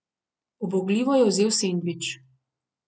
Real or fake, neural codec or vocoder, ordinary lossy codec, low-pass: real; none; none; none